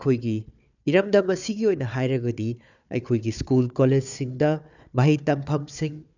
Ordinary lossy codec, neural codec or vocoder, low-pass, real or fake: none; codec, 16 kHz, 4 kbps, FunCodec, trained on Chinese and English, 50 frames a second; 7.2 kHz; fake